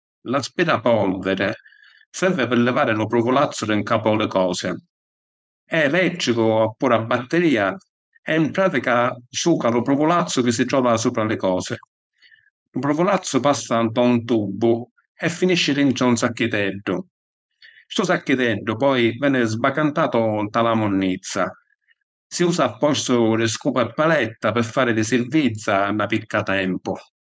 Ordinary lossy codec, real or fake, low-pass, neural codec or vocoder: none; fake; none; codec, 16 kHz, 4.8 kbps, FACodec